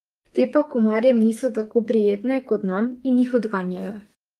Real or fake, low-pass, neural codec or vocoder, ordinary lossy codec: fake; 14.4 kHz; codec, 32 kHz, 1.9 kbps, SNAC; Opus, 32 kbps